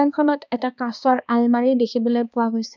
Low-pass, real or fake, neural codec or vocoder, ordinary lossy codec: 7.2 kHz; fake; codec, 16 kHz, 2 kbps, X-Codec, HuBERT features, trained on balanced general audio; none